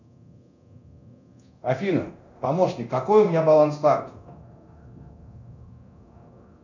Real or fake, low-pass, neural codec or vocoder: fake; 7.2 kHz; codec, 24 kHz, 0.9 kbps, DualCodec